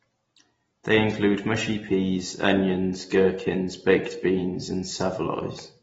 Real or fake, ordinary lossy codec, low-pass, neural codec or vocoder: real; AAC, 24 kbps; 19.8 kHz; none